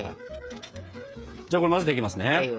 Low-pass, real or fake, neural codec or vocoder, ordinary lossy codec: none; fake; codec, 16 kHz, 8 kbps, FreqCodec, smaller model; none